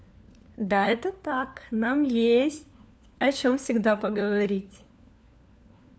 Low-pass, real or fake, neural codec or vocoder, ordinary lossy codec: none; fake; codec, 16 kHz, 4 kbps, FunCodec, trained on LibriTTS, 50 frames a second; none